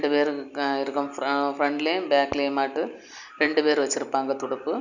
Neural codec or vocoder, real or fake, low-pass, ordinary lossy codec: none; real; 7.2 kHz; none